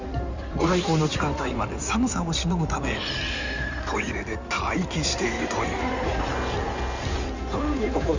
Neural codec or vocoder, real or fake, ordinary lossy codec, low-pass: codec, 16 kHz in and 24 kHz out, 2.2 kbps, FireRedTTS-2 codec; fake; Opus, 64 kbps; 7.2 kHz